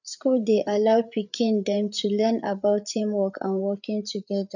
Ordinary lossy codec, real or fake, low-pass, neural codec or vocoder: none; fake; 7.2 kHz; codec, 16 kHz, 8 kbps, FreqCodec, larger model